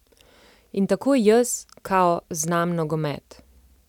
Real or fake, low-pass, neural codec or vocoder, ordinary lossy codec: real; 19.8 kHz; none; none